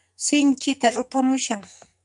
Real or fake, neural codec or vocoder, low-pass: fake; codec, 44.1 kHz, 2.6 kbps, SNAC; 10.8 kHz